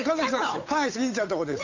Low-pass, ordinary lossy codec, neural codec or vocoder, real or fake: 7.2 kHz; AAC, 48 kbps; codec, 16 kHz, 8 kbps, FunCodec, trained on Chinese and English, 25 frames a second; fake